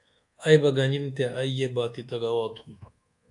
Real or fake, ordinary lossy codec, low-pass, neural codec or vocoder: fake; AAC, 64 kbps; 10.8 kHz; codec, 24 kHz, 1.2 kbps, DualCodec